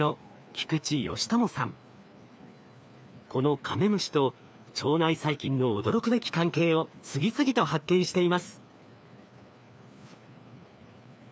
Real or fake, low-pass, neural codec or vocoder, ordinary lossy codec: fake; none; codec, 16 kHz, 2 kbps, FreqCodec, larger model; none